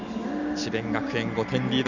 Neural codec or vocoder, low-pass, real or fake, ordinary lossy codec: none; 7.2 kHz; real; none